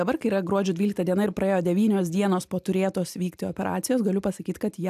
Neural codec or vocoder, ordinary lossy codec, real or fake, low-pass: none; AAC, 96 kbps; real; 14.4 kHz